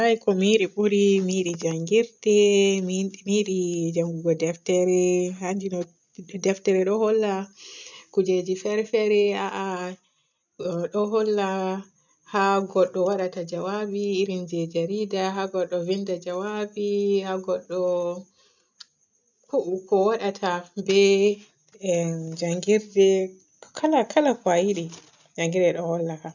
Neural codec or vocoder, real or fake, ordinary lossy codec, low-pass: none; real; none; 7.2 kHz